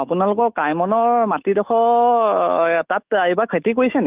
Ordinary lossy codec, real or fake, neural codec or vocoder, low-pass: Opus, 32 kbps; fake; autoencoder, 48 kHz, 128 numbers a frame, DAC-VAE, trained on Japanese speech; 3.6 kHz